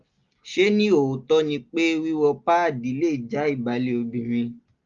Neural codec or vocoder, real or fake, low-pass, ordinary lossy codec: none; real; 7.2 kHz; Opus, 32 kbps